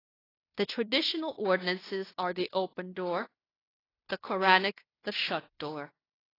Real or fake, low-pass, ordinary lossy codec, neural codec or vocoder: fake; 5.4 kHz; AAC, 24 kbps; codec, 16 kHz in and 24 kHz out, 0.9 kbps, LongCat-Audio-Codec, fine tuned four codebook decoder